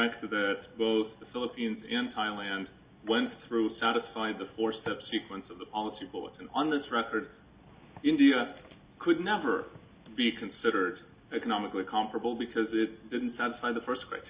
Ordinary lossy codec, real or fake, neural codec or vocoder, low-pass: Opus, 64 kbps; real; none; 3.6 kHz